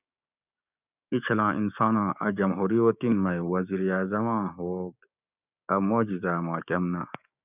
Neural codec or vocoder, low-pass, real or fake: codec, 16 kHz, 6 kbps, DAC; 3.6 kHz; fake